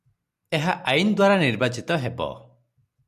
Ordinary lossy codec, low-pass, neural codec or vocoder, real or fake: MP3, 96 kbps; 14.4 kHz; none; real